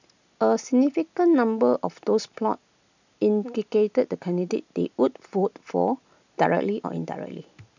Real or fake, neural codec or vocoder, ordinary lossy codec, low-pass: real; none; none; 7.2 kHz